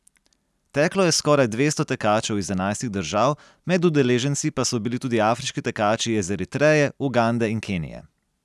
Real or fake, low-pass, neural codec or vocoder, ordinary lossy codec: real; none; none; none